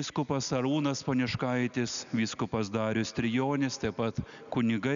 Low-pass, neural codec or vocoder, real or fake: 7.2 kHz; none; real